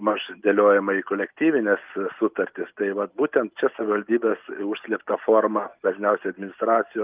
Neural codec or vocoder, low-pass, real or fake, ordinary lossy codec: none; 3.6 kHz; real; Opus, 24 kbps